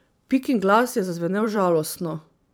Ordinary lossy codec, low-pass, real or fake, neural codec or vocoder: none; none; fake; vocoder, 44.1 kHz, 128 mel bands every 512 samples, BigVGAN v2